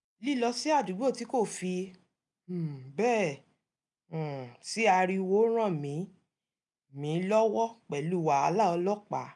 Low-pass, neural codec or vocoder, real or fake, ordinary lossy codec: 10.8 kHz; none; real; none